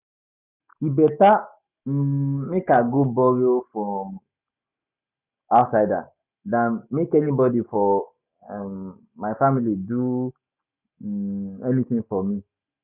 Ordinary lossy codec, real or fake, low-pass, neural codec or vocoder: none; real; 3.6 kHz; none